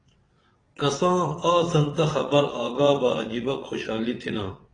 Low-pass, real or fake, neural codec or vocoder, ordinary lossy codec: 9.9 kHz; fake; vocoder, 22.05 kHz, 80 mel bands, WaveNeXt; AAC, 32 kbps